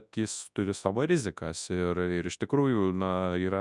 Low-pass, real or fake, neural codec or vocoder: 10.8 kHz; fake; codec, 24 kHz, 0.9 kbps, WavTokenizer, large speech release